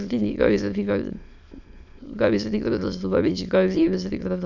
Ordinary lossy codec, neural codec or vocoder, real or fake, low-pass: none; autoencoder, 22.05 kHz, a latent of 192 numbers a frame, VITS, trained on many speakers; fake; 7.2 kHz